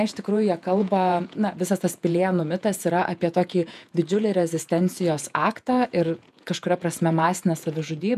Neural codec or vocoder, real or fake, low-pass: vocoder, 48 kHz, 128 mel bands, Vocos; fake; 14.4 kHz